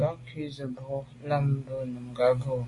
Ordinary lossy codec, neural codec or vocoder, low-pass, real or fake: AAC, 32 kbps; none; 10.8 kHz; real